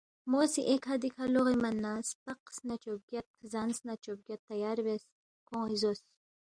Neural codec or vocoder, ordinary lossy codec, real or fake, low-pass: none; Opus, 64 kbps; real; 9.9 kHz